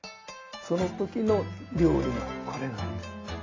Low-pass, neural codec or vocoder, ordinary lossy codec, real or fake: 7.2 kHz; none; AAC, 32 kbps; real